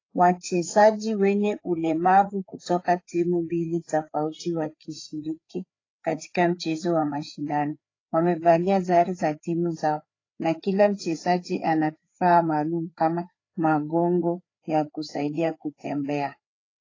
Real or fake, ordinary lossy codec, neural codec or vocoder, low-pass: fake; AAC, 32 kbps; codec, 16 kHz, 4 kbps, FreqCodec, larger model; 7.2 kHz